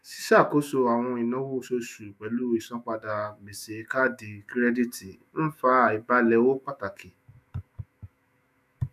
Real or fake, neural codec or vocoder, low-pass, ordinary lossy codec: fake; autoencoder, 48 kHz, 128 numbers a frame, DAC-VAE, trained on Japanese speech; 14.4 kHz; none